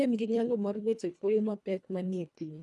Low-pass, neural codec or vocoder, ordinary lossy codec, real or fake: none; codec, 24 kHz, 1.5 kbps, HILCodec; none; fake